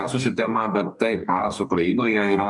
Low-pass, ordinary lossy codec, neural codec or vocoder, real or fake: 10.8 kHz; MP3, 96 kbps; codec, 44.1 kHz, 2.6 kbps, DAC; fake